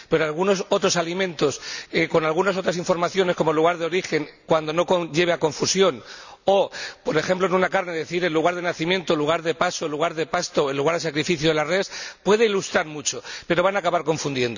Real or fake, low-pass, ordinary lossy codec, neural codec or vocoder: real; 7.2 kHz; none; none